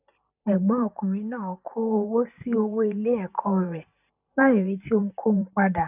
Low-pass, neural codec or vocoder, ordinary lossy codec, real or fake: 3.6 kHz; vocoder, 44.1 kHz, 128 mel bands every 512 samples, BigVGAN v2; none; fake